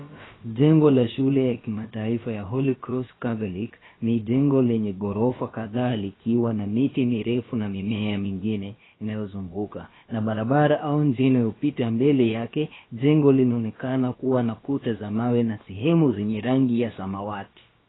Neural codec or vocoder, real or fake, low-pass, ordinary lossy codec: codec, 16 kHz, about 1 kbps, DyCAST, with the encoder's durations; fake; 7.2 kHz; AAC, 16 kbps